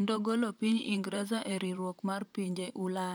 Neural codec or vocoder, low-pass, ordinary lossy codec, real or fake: vocoder, 44.1 kHz, 128 mel bands, Pupu-Vocoder; none; none; fake